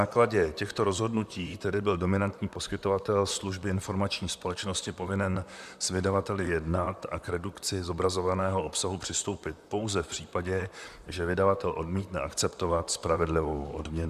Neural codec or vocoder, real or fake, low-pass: vocoder, 44.1 kHz, 128 mel bands, Pupu-Vocoder; fake; 14.4 kHz